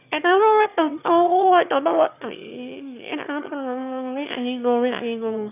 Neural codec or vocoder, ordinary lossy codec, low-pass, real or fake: autoencoder, 22.05 kHz, a latent of 192 numbers a frame, VITS, trained on one speaker; none; 3.6 kHz; fake